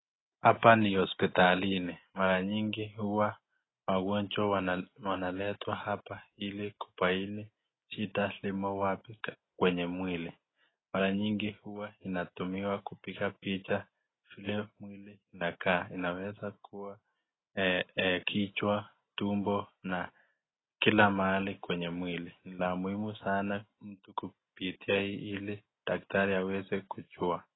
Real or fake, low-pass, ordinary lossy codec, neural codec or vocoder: real; 7.2 kHz; AAC, 16 kbps; none